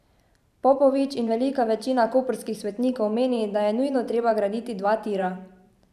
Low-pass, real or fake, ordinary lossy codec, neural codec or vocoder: 14.4 kHz; fake; none; vocoder, 44.1 kHz, 128 mel bands every 512 samples, BigVGAN v2